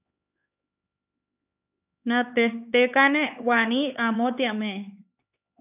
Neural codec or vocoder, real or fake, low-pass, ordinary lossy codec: codec, 16 kHz, 4 kbps, X-Codec, HuBERT features, trained on LibriSpeech; fake; 3.6 kHz; AAC, 32 kbps